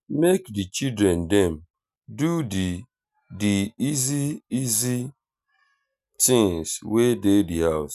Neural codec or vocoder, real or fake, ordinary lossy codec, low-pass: none; real; none; 14.4 kHz